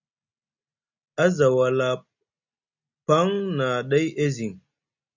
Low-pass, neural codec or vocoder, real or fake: 7.2 kHz; none; real